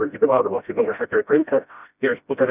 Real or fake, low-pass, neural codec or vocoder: fake; 3.6 kHz; codec, 16 kHz, 0.5 kbps, FreqCodec, smaller model